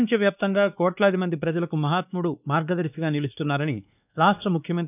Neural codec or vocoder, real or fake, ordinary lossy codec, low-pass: codec, 16 kHz, 2 kbps, X-Codec, WavLM features, trained on Multilingual LibriSpeech; fake; none; 3.6 kHz